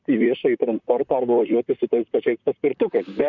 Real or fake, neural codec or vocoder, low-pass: fake; vocoder, 44.1 kHz, 128 mel bands, Pupu-Vocoder; 7.2 kHz